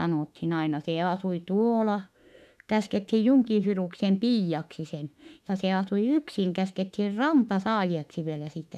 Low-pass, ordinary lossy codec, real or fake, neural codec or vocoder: 14.4 kHz; none; fake; autoencoder, 48 kHz, 32 numbers a frame, DAC-VAE, trained on Japanese speech